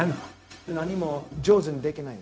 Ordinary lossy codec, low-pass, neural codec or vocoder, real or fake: none; none; codec, 16 kHz, 0.4 kbps, LongCat-Audio-Codec; fake